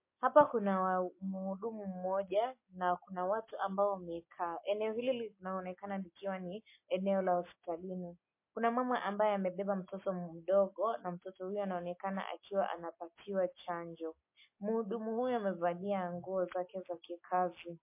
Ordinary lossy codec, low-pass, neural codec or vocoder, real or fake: MP3, 24 kbps; 3.6 kHz; none; real